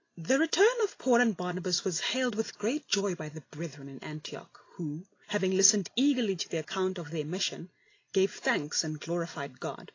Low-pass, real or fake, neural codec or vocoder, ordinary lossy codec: 7.2 kHz; real; none; AAC, 32 kbps